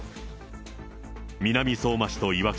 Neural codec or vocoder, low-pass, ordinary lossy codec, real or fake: none; none; none; real